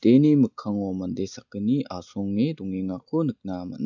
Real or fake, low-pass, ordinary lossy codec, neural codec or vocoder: real; 7.2 kHz; none; none